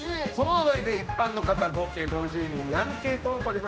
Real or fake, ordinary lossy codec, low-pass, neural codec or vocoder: fake; none; none; codec, 16 kHz, 1 kbps, X-Codec, HuBERT features, trained on general audio